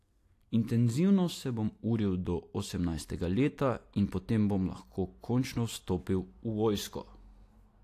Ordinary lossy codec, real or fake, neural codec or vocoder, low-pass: AAC, 64 kbps; real; none; 14.4 kHz